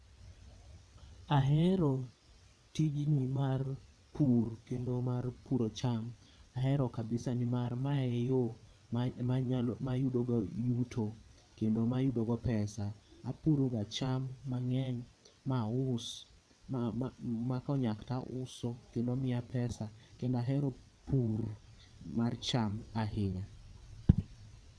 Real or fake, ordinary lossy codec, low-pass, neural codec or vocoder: fake; none; none; vocoder, 22.05 kHz, 80 mel bands, WaveNeXt